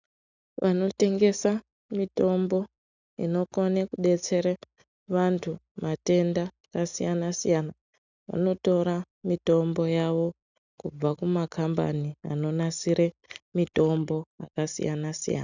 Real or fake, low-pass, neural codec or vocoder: real; 7.2 kHz; none